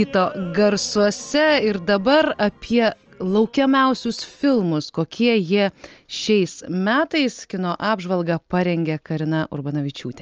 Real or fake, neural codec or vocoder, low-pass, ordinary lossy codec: real; none; 7.2 kHz; Opus, 24 kbps